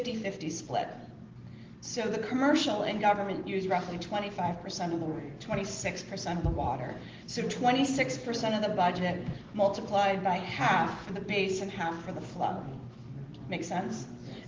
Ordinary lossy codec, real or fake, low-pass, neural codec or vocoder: Opus, 16 kbps; real; 7.2 kHz; none